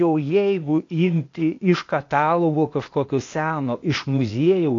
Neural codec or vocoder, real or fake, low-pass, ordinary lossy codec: codec, 16 kHz, 0.8 kbps, ZipCodec; fake; 7.2 kHz; AAC, 48 kbps